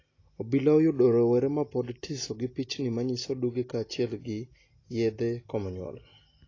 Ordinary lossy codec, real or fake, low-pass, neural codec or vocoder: AAC, 32 kbps; real; 7.2 kHz; none